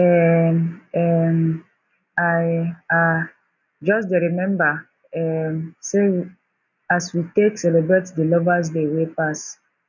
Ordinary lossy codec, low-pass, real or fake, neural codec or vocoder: none; 7.2 kHz; real; none